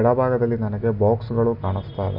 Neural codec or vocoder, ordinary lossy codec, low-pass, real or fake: none; none; 5.4 kHz; real